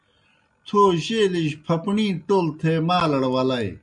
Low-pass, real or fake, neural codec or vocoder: 9.9 kHz; real; none